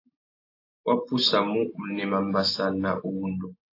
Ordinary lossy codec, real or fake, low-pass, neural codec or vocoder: AAC, 32 kbps; real; 5.4 kHz; none